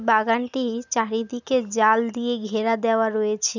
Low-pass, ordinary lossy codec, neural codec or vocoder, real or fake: 7.2 kHz; none; none; real